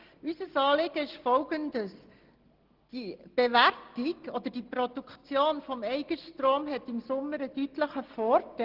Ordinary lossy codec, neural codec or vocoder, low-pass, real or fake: Opus, 16 kbps; none; 5.4 kHz; real